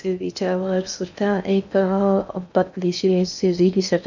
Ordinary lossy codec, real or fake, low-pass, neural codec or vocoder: none; fake; 7.2 kHz; codec, 16 kHz in and 24 kHz out, 0.6 kbps, FocalCodec, streaming, 2048 codes